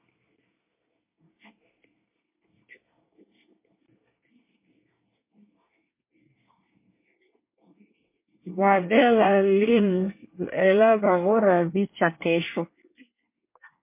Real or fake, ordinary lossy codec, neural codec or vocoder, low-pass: fake; MP3, 24 kbps; codec, 24 kHz, 1 kbps, SNAC; 3.6 kHz